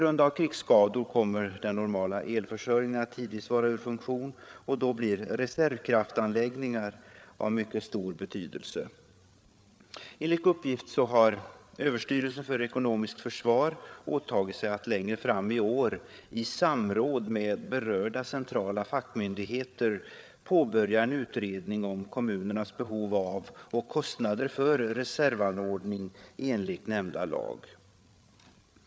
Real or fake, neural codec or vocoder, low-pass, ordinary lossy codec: fake; codec, 16 kHz, 8 kbps, FreqCodec, larger model; none; none